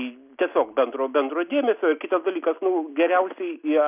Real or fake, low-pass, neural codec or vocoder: real; 3.6 kHz; none